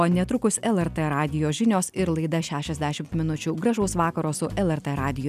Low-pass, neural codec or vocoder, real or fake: 14.4 kHz; none; real